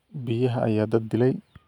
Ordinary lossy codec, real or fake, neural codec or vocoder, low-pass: none; real; none; 19.8 kHz